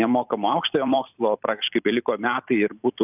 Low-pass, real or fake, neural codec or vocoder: 3.6 kHz; fake; vocoder, 44.1 kHz, 128 mel bands every 512 samples, BigVGAN v2